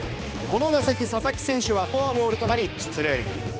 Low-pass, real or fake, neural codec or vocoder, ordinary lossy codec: none; fake; codec, 16 kHz, 2 kbps, X-Codec, HuBERT features, trained on balanced general audio; none